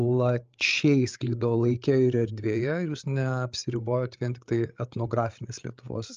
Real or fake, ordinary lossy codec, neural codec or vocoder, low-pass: fake; Opus, 24 kbps; codec, 16 kHz, 8 kbps, FreqCodec, larger model; 7.2 kHz